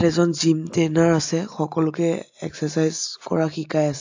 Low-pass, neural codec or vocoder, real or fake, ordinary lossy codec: 7.2 kHz; none; real; AAC, 48 kbps